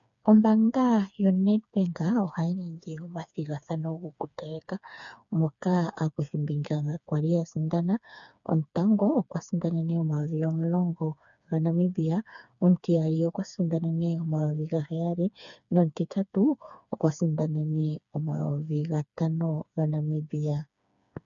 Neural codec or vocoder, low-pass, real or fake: codec, 16 kHz, 4 kbps, FreqCodec, smaller model; 7.2 kHz; fake